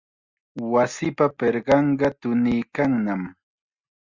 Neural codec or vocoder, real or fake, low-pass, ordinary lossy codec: none; real; 7.2 kHz; Opus, 64 kbps